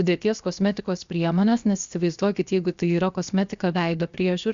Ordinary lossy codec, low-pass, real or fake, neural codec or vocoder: Opus, 64 kbps; 7.2 kHz; fake; codec, 16 kHz, 0.7 kbps, FocalCodec